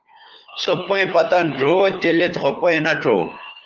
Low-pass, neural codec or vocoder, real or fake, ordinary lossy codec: 7.2 kHz; codec, 16 kHz, 4 kbps, FunCodec, trained on LibriTTS, 50 frames a second; fake; Opus, 24 kbps